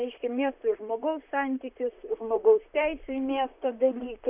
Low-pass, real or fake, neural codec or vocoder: 3.6 kHz; fake; vocoder, 44.1 kHz, 128 mel bands, Pupu-Vocoder